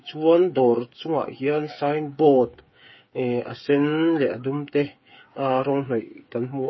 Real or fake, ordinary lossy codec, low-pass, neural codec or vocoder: fake; MP3, 24 kbps; 7.2 kHz; codec, 16 kHz, 8 kbps, FreqCodec, smaller model